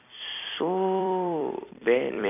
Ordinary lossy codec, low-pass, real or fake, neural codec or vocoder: none; 3.6 kHz; fake; vocoder, 44.1 kHz, 128 mel bands every 256 samples, BigVGAN v2